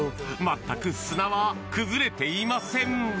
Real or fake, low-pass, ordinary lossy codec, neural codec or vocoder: real; none; none; none